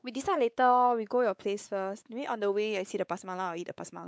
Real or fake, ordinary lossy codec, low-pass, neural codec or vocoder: fake; none; none; codec, 16 kHz, 4 kbps, X-Codec, WavLM features, trained on Multilingual LibriSpeech